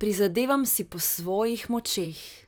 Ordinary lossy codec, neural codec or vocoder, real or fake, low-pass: none; vocoder, 44.1 kHz, 128 mel bands, Pupu-Vocoder; fake; none